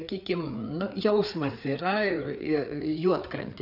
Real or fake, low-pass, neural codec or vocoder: fake; 5.4 kHz; codec, 16 kHz, 4 kbps, FreqCodec, larger model